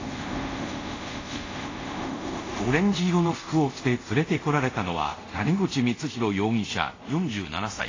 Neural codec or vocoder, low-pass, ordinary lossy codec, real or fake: codec, 24 kHz, 0.5 kbps, DualCodec; 7.2 kHz; AAC, 32 kbps; fake